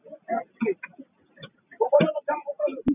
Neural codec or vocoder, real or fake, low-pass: none; real; 3.6 kHz